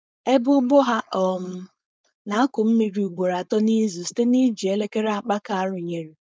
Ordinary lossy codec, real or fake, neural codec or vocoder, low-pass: none; fake; codec, 16 kHz, 4.8 kbps, FACodec; none